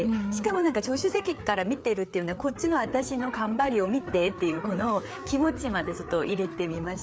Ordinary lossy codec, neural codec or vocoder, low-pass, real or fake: none; codec, 16 kHz, 4 kbps, FreqCodec, larger model; none; fake